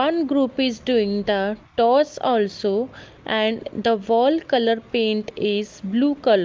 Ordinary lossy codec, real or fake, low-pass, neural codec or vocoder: Opus, 32 kbps; real; 7.2 kHz; none